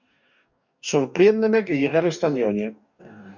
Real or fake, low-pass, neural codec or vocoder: fake; 7.2 kHz; codec, 44.1 kHz, 2.6 kbps, DAC